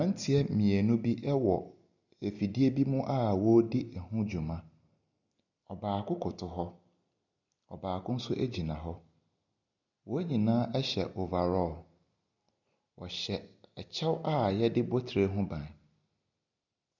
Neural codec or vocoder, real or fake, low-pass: none; real; 7.2 kHz